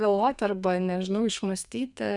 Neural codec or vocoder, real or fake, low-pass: codec, 32 kHz, 1.9 kbps, SNAC; fake; 10.8 kHz